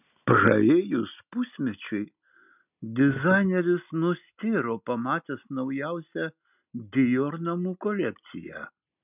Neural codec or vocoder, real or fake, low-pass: none; real; 3.6 kHz